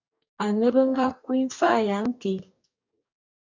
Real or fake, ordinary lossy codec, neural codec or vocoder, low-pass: fake; MP3, 64 kbps; codec, 44.1 kHz, 2.6 kbps, DAC; 7.2 kHz